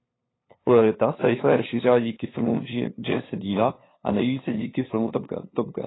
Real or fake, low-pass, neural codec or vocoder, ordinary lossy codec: fake; 7.2 kHz; codec, 16 kHz, 2 kbps, FunCodec, trained on LibriTTS, 25 frames a second; AAC, 16 kbps